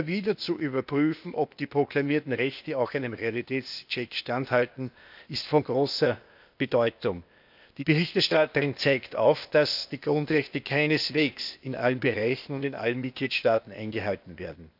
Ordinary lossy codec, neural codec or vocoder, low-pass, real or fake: none; codec, 16 kHz, 0.8 kbps, ZipCodec; 5.4 kHz; fake